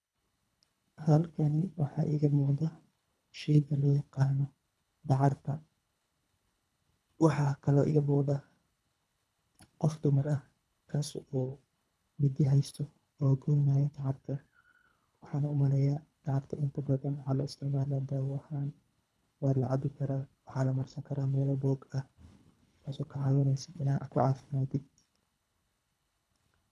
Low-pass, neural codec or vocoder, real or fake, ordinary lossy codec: none; codec, 24 kHz, 3 kbps, HILCodec; fake; none